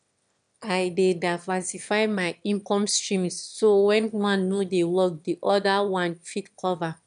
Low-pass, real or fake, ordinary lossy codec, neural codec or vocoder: 9.9 kHz; fake; none; autoencoder, 22.05 kHz, a latent of 192 numbers a frame, VITS, trained on one speaker